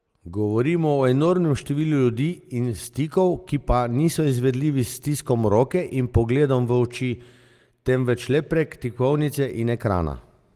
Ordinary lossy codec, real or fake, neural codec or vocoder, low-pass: Opus, 24 kbps; real; none; 14.4 kHz